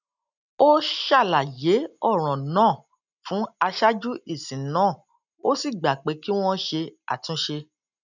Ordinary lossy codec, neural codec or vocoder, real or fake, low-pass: none; none; real; 7.2 kHz